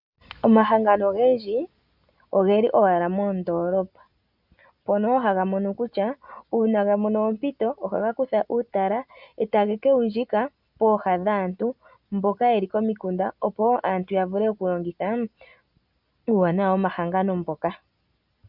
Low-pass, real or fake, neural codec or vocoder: 5.4 kHz; real; none